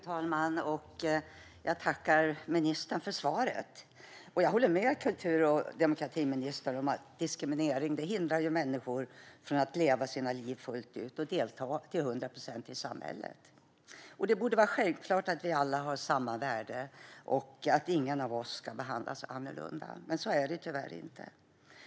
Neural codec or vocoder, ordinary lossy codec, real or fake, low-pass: none; none; real; none